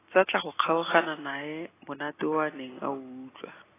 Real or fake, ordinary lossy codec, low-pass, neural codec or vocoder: real; AAC, 16 kbps; 3.6 kHz; none